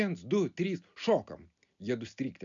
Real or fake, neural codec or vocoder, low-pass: real; none; 7.2 kHz